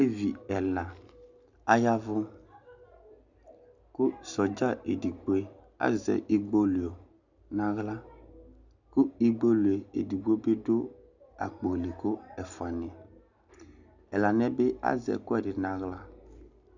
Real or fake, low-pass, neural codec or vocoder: real; 7.2 kHz; none